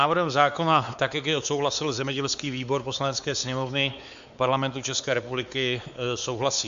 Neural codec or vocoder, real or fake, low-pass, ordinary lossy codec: codec, 16 kHz, 4 kbps, X-Codec, WavLM features, trained on Multilingual LibriSpeech; fake; 7.2 kHz; Opus, 64 kbps